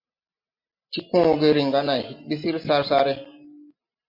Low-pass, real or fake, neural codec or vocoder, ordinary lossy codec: 5.4 kHz; real; none; MP3, 32 kbps